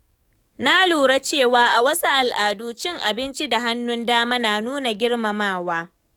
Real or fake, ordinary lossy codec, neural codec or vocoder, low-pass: fake; none; codec, 44.1 kHz, 7.8 kbps, DAC; 19.8 kHz